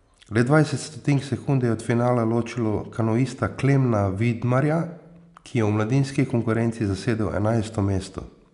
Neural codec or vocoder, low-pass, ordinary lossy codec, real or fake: none; 10.8 kHz; none; real